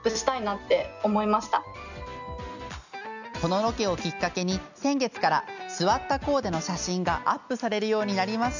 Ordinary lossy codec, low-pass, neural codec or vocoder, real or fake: none; 7.2 kHz; none; real